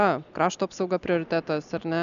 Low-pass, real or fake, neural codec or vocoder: 7.2 kHz; real; none